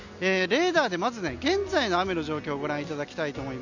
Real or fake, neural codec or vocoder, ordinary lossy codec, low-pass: real; none; none; 7.2 kHz